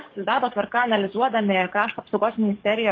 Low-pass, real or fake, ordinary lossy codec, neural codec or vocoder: 7.2 kHz; fake; AAC, 32 kbps; codec, 44.1 kHz, 7.8 kbps, DAC